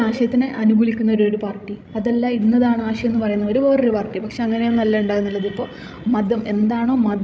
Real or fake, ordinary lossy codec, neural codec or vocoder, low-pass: fake; none; codec, 16 kHz, 16 kbps, FreqCodec, larger model; none